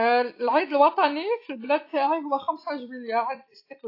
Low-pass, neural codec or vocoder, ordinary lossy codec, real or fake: 5.4 kHz; none; AAC, 32 kbps; real